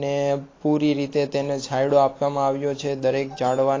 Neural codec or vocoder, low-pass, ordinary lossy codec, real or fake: none; 7.2 kHz; AAC, 32 kbps; real